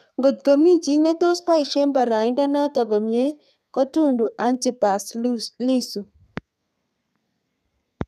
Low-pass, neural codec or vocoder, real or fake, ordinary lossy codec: 14.4 kHz; codec, 32 kHz, 1.9 kbps, SNAC; fake; none